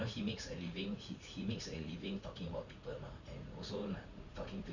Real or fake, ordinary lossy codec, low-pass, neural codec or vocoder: fake; Opus, 64 kbps; 7.2 kHz; vocoder, 44.1 kHz, 80 mel bands, Vocos